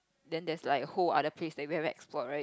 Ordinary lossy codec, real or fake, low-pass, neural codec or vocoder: none; real; none; none